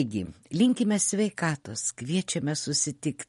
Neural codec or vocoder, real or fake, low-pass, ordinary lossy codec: none; real; 19.8 kHz; MP3, 48 kbps